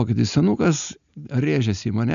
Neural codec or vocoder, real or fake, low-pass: none; real; 7.2 kHz